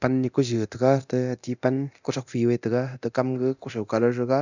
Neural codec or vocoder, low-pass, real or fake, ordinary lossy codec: codec, 24 kHz, 0.9 kbps, DualCodec; 7.2 kHz; fake; none